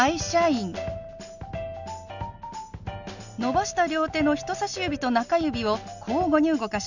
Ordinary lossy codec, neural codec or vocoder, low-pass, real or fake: none; none; 7.2 kHz; real